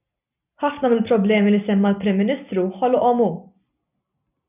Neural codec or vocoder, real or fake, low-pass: none; real; 3.6 kHz